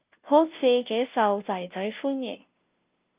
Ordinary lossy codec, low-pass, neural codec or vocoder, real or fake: Opus, 24 kbps; 3.6 kHz; codec, 16 kHz, 0.5 kbps, FunCodec, trained on Chinese and English, 25 frames a second; fake